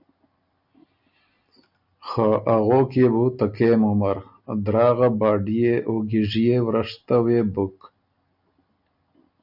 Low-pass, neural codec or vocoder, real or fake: 5.4 kHz; none; real